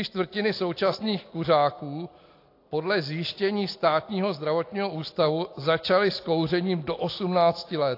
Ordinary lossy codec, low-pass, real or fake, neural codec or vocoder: AAC, 32 kbps; 5.4 kHz; real; none